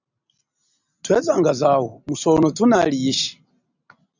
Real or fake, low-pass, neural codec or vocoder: real; 7.2 kHz; none